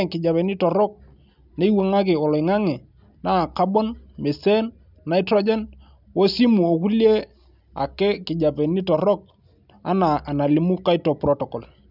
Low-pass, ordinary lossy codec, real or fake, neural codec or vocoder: 5.4 kHz; none; real; none